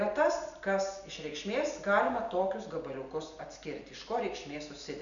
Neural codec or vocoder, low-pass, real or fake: none; 7.2 kHz; real